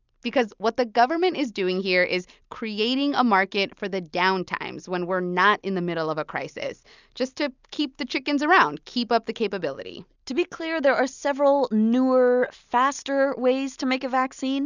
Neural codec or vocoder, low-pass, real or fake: none; 7.2 kHz; real